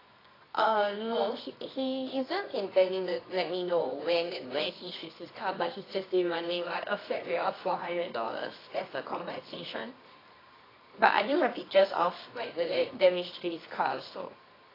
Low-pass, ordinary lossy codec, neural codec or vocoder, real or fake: 5.4 kHz; AAC, 24 kbps; codec, 24 kHz, 0.9 kbps, WavTokenizer, medium music audio release; fake